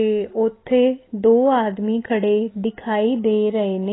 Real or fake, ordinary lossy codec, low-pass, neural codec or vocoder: real; AAC, 16 kbps; 7.2 kHz; none